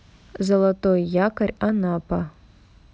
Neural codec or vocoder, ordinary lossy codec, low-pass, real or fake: none; none; none; real